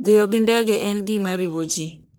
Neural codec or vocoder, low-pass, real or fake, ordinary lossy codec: codec, 44.1 kHz, 1.7 kbps, Pupu-Codec; none; fake; none